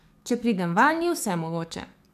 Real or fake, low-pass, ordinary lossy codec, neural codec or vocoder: fake; 14.4 kHz; none; codec, 44.1 kHz, 7.8 kbps, DAC